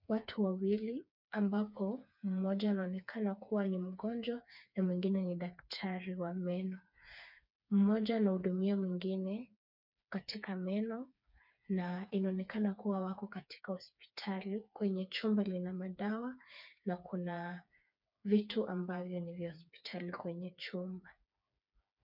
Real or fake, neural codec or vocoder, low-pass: fake; codec, 16 kHz, 4 kbps, FreqCodec, smaller model; 5.4 kHz